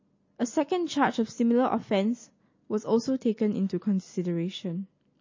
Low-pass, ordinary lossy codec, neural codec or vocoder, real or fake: 7.2 kHz; MP3, 32 kbps; none; real